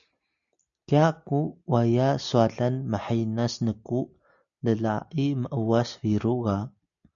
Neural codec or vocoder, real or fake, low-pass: none; real; 7.2 kHz